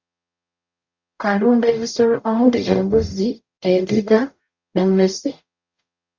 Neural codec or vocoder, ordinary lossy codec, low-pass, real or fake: codec, 44.1 kHz, 0.9 kbps, DAC; Opus, 64 kbps; 7.2 kHz; fake